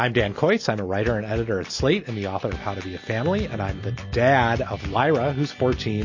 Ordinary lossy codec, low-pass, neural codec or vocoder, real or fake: MP3, 32 kbps; 7.2 kHz; none; real